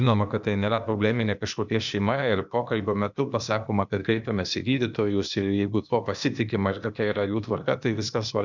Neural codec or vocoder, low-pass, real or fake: codec, 16 kHz, 0.8 kbps, ZipCodec; 7.2 kHz; fake